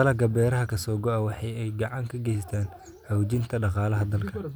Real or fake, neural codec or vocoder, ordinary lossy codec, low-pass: real; none; none; none